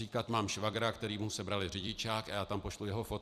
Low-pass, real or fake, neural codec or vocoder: 14.4 kHz; fake; vocoder, 44.1 kHz, 128 mel bands every 256 samples, BigVGAN v2